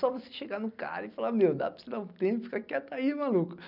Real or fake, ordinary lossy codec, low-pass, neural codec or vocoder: real; none; 5.4 kHz; none